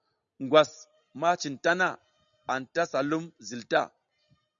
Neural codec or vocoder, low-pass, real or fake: none; 7.2 kHz; real